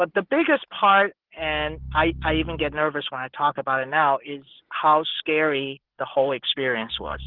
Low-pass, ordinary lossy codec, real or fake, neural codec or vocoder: 5.4 kHz; Opus, 16 kbps; real; none